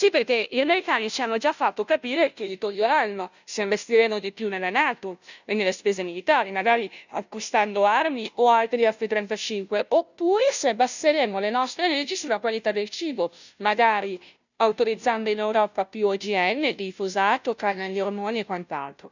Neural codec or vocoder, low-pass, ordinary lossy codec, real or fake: codec, 16 kHz, 0.5 kbps, FunCodec, trained on Chinese and English, 25 frames a second; 7.2 kHz; none; fake